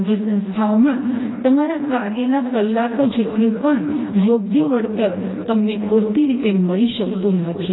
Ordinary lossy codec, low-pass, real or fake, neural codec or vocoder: AAC, 16 kbps; 7.2 kHz; fake; codec, 16 kHz, 1 kbps, FreqCodec, smaller model